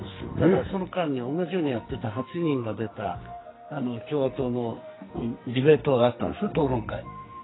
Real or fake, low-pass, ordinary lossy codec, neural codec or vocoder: fake; 7.2 kHz; AAC, 16 kbps; codec, 44.1 kHz, 2.6 kbps, SNAC